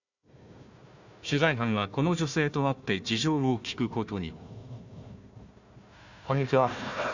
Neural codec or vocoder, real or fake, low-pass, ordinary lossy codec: codec, 16 kHz, 1 kbps, FunCodec, trained on Chinese and English, 50 frames a second; fake; 7.2 kHz; none